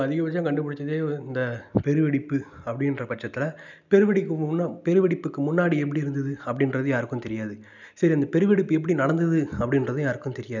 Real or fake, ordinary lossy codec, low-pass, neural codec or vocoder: real; none; 7.2 kHz; none